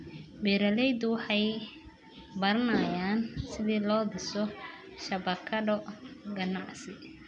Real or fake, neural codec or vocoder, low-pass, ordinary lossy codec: real; none; 10.8 kHz; none